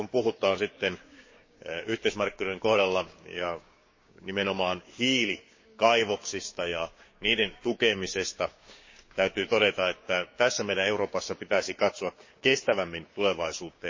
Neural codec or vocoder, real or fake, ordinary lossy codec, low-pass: codec, 16 kHz, 6 kbps, DAC; fake; MP3, 32 kbps; 7.2 kHz